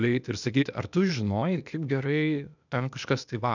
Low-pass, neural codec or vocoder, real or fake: 7.2 kHz; codec, 16 kHz, 0.8 kbps, ZipCodec; fake